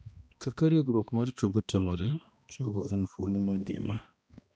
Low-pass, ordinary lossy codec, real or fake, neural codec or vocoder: none; none; fake; codec, 16 kHz, 1 kbps, X-Codec, HuBERT features, trained on balanced general audio